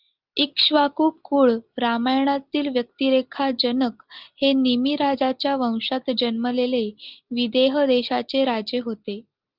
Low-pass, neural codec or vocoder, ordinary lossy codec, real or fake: 5.4 kHz; none; Opus, 32 kbps; real